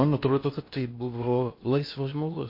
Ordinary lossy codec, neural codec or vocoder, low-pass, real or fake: MP3, 32 kbps; codec, 16 kHz in and 24 kHz out, 0.8 kbps, FocalCodec, streaming, 65536 codes; 5.4 kHz; fake